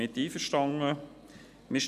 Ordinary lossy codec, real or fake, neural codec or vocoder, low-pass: none; real; none; 14.4 kHz